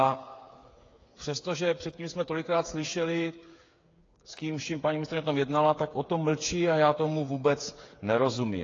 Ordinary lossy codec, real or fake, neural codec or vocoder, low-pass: AAC, 32 kbps; fake; codec, 16 kHz, 8 kbps, FreqCodec, smaller model; 7.2 kHz